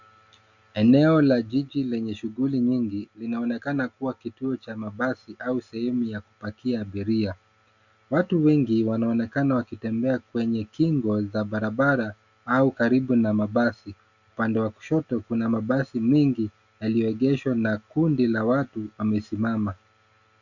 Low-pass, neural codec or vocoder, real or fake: 7.2 kHz; none; real